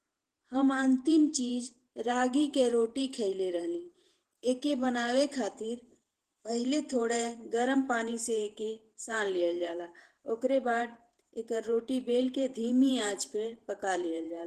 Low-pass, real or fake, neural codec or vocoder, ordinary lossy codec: 14.4 kHz; fake; vocoder, 48 kHz, 128 mel bands, Vocos; Opus, 16 kbps